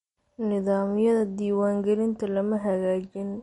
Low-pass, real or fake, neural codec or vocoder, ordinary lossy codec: 19.8 kHz; real; none; MP3, 48 kbps